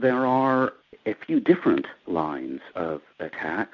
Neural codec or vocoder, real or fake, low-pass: none; real; 7.2 kHz